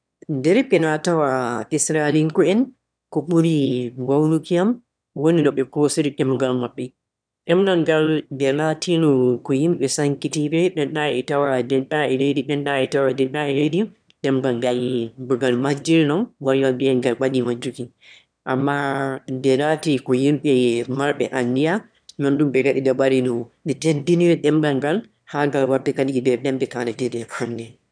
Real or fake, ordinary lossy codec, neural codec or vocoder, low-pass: fake; none; autoencoder, 22.05 kHz, a latent of 192 numbers a frame, VITS, trained on one speaker; 9.9 kHz